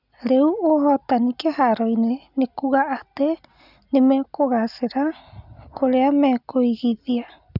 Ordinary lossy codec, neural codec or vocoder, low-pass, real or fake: none; none; 5.4 kHz; real